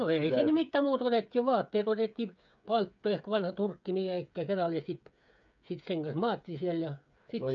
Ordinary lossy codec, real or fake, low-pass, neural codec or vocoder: none; fake; 7.2 kHz; codec, 16 kHz, 8 kbps, FreqCodec, smaller model